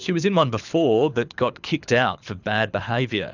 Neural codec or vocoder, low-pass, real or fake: codec, 24 kHz, 6 kbps, HILCodec; 7.2 kHz; fake